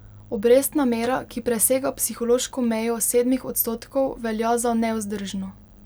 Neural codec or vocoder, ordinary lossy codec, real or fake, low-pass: none; none; real; none